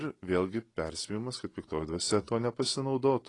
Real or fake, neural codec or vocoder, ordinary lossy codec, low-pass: real; none; AAC, 32 kbps; 10.8 kHz